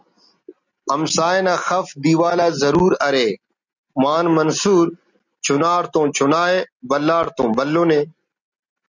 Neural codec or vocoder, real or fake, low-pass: none; real; 7.2 kHz